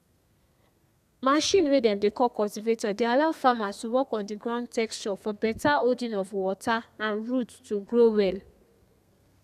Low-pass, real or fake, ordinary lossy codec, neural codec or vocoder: 14.4 kHz; fake; none; codec, 32 kHz, 1.9 kbps, SNAC